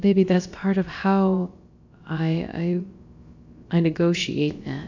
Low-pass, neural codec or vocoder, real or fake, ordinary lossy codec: 7.2 kHz; codec, 16 kHz, about 1 kbps, DyCAST, with the encoder's durations; fake; AAC, 48 kbps